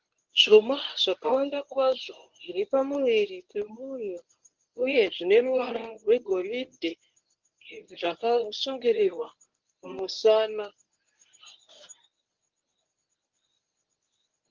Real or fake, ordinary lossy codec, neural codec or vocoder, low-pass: fake; Opus, 32 kbps; codec, 24 kHz, 0.9 kbps, WavTokenizer, medium speech release version 1; 7.2 kHz